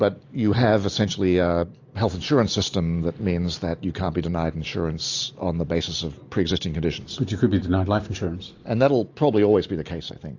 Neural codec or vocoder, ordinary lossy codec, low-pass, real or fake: none; AAC, 48 kbps; 7.2 kHz; real